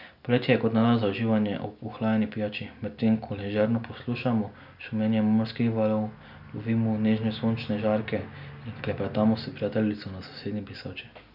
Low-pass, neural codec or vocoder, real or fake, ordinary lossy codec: 5.4 kHz; none; real; none